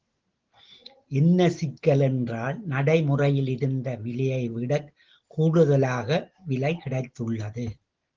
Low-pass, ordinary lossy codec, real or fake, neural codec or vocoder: 7.2 kHz; Opus, 16 kbps; real; none